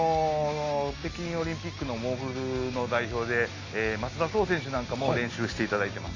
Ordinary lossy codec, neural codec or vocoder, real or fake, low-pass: AAC, 32 kbps; none; real; 7.2 kHz